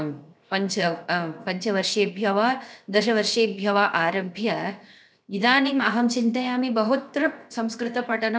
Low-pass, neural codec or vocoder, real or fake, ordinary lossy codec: none; codec, 16 kHz, 0.7 kbps, FocalCodec; fake; none